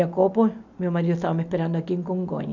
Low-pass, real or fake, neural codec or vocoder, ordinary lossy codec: 7.2 kHz; real; none; none